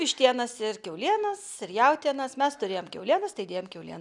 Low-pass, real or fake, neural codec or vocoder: 10.8 kHz; real; none